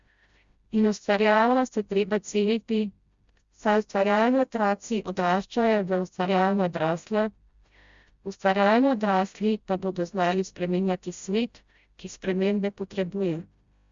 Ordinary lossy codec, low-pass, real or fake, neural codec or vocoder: Opus, 64 kbps; 7.2 kHz; fake; codec, 16 kHz, 0.5 kbps, FreqCodec, smaller model